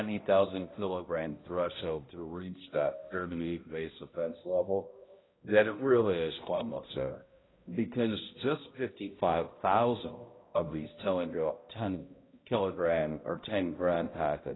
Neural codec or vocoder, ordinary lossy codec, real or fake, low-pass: codec, 16 kHz, 0.5 kbps, X-Codec, HuBERT features, trained on balanced general audio; AAC, 16 kbps; fake; 7.2 kHz